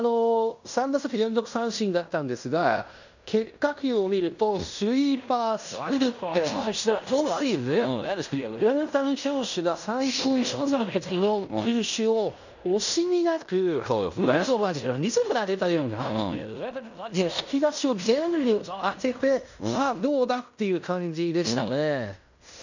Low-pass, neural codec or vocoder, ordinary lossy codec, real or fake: 7.2 kHz; codec, 16 kHz in and 24 kHz out, 0.9 kbps, LongCat-Audio-Codec, fine tuned four codebook decoder; none; fake